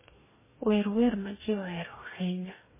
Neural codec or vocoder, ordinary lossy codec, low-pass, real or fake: codec, 44.1 kHz, 2.6 kbps, DAC; MP3, 16 kbps; 3.6 kHz; fake